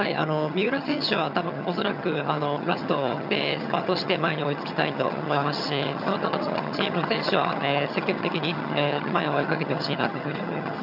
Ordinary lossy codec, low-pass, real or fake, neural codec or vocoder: none; 5.4 kHz; fake; vocoder, 22.05 kHz, 80 mel bands, HiFi-GAN